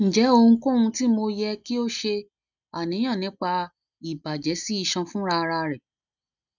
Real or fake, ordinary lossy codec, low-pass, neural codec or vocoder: real; none; 7.2 kHz; none